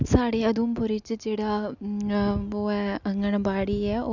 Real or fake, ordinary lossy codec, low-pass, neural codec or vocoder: real; none; 7.2 kHz; none